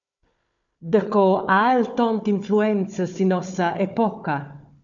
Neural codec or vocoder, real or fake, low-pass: codec, 16 kHz, 4 kbps, FunCodec, trained on Chinese and English, 50 frames a second; fake; 7.2 kHz